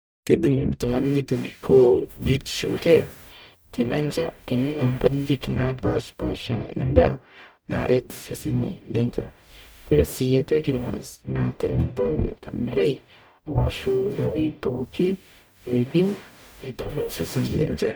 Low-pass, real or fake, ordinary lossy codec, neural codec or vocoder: none; fake; none; codec, 44.1 kHz, 0.9 kbps, DAC